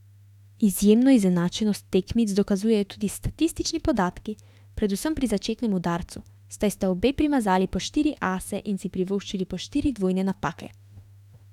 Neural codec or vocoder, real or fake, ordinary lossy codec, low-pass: autoencoder, 48 kHz, 32 numbers a frame, DAC-VAE, trained on Japanese speech; fake; none; 19.8 kHz